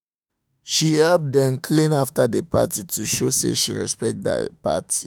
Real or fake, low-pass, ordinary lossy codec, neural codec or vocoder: fake; none; none; autoencoder, 48 kHz, 32 numbers a frame, DAC-VAE, trained on Japanese speech